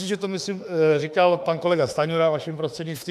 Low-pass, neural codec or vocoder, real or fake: 14.4 kHz; autoencoder, 48 kHz, 32 numbers a frame, DAC-VAE, trained on Japanese speech; fake